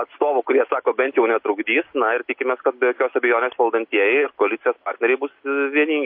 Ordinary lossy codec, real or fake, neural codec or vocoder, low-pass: MP3, 32 kbps; real; none; 5.4 kHz